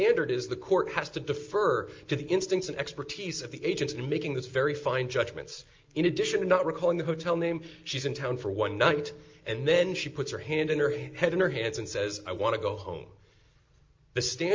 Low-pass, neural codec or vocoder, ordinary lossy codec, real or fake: 7.2 kHz; none; Opus, 32 kbps; real